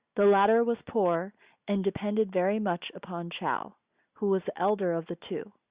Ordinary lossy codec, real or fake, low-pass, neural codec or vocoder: Opus, 64 kbps; real; 3.6 kHz; none